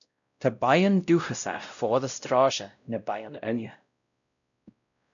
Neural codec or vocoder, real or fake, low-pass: codec, 16 kHz, 0.5 kbps, X-Codec, WavLM features, trained on Multilingual LibriSpeech; fake; 7.2 kHz